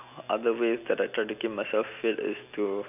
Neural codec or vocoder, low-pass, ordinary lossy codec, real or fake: none; 3.6 kHz; none; real